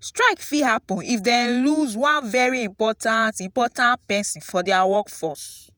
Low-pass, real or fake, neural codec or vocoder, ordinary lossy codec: none; fake; vocoder, 48 kHz, 128 mel bands, Vocos; none